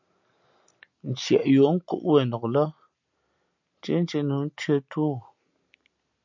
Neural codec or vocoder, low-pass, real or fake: none; 7.2 kHz; real